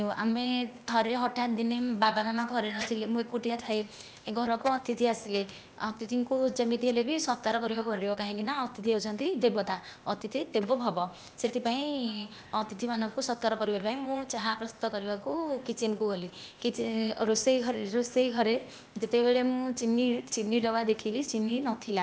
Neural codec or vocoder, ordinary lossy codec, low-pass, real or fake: codec, 16 kHz, 0.8 kbps, ZipCodec; none; none; fake